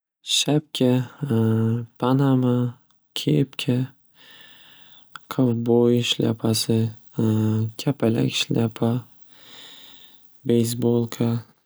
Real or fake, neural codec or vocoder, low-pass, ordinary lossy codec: real; none; none; none